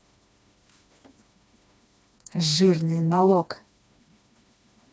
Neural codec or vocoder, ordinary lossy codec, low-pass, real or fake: codec, 16 kHz, 2 kbps, FreqCodec, smaller model; none; none; fake